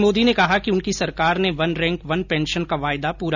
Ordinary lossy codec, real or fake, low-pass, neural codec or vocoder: none; real; 7.2 kHz; none